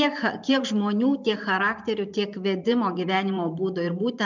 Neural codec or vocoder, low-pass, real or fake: vocoder, 44.1 kHz, 128 mel bands every 512 samples, BigVGAN v2; 7.2 kHz; fake